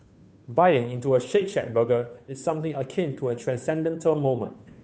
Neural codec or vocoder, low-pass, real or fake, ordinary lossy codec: codec, 16 kHz, 2 kbps, FunCodec, trained on Chinese and English, 25 frames a second; none; fake; none